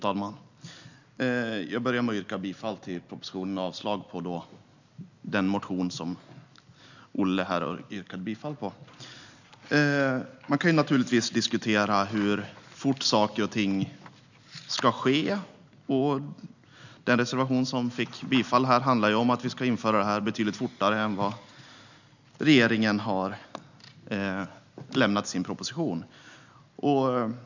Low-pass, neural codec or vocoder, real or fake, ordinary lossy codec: 7.2 kHz; none; real; none